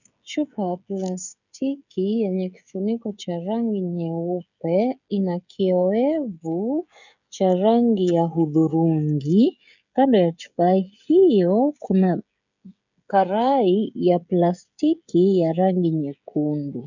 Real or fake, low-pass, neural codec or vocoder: fake; 7.2 kHz; codec, 16 kHz, 16 kbps, FreqCodec, smaller model